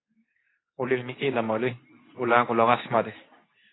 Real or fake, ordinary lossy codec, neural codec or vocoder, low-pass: fake; AAC, 16 kbps; codec, 24 kHz, 0.9 kbps, WavTokenizer, medium speech release version 2; 7.2 kHz